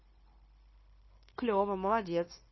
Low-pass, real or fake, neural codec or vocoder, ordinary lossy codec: 7.2 kHz; fake; codec, 16 kHz, 0.9 kbps, LongCat-Audio-Codec; MP3, 24 kbps